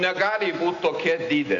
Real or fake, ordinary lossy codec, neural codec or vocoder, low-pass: real; AAC, 48 kbps; none; 7.2 kHz